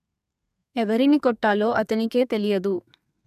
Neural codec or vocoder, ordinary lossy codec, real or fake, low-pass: codec, 32 kHz, 1.9 kbps, SNAC; none; fake; 14.4 kHz